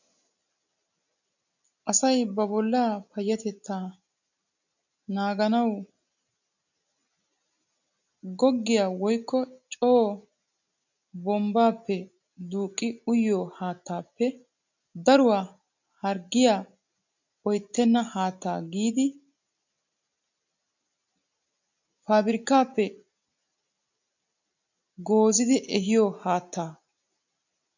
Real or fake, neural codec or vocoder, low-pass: real; none; 7.2 kHz